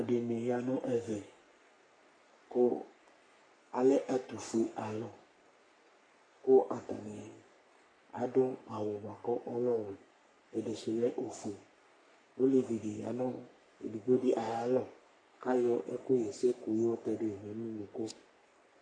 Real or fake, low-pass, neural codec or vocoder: fake; 9.9 kHz; codec, 44.1 kHz, 3.4 kbps, Pupu-Codec